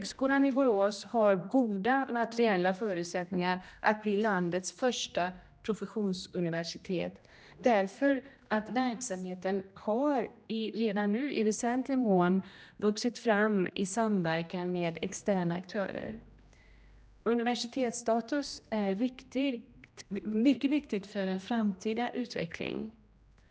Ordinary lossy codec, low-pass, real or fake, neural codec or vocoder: none; none; fake; codec, 16 kHz, 1 kbps, X-Codec, HuBERT features, trained on general audio